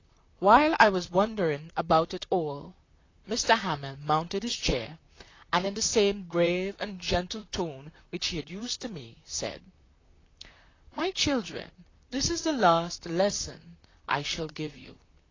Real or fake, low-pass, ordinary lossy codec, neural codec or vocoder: fake; 7.2 kHz; AAC, 32 kbps; vocoder, 44.1 kHz, 128 mel bands, Pupu-Vocoder